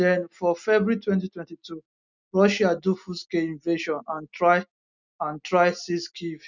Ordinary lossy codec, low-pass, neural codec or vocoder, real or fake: none; 7.2 kHz; none; real